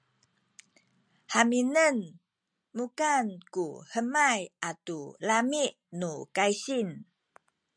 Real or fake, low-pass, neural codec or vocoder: real; 9.9 kHz; none